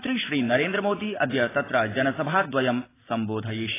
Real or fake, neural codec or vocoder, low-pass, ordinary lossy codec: real; none; 3.6 kHz; AAC, 16 kbps